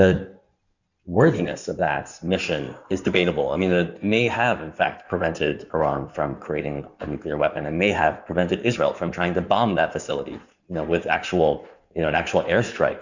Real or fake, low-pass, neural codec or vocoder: fake; 7.2 kHz; codec, 16 kHz in and 24 kHz out, 2.2 kbps, FireRedTTS-2 codec